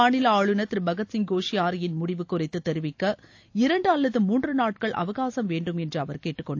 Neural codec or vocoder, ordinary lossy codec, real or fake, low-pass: none; AAC, 48 kbps; real; 7.2 kHz